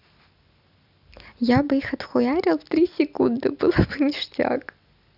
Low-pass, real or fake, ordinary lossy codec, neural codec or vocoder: 5.4 kHz; real; none; none